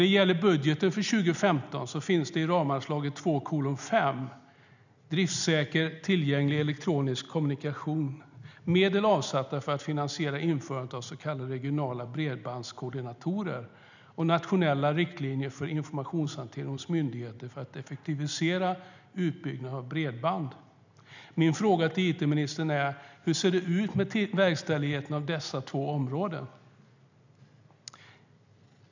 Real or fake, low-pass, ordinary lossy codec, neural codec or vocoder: real; 7.2 kHz; none; none